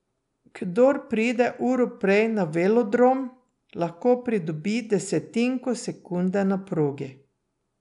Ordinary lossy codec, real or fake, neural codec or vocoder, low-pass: none; real; none; 10.8 kHz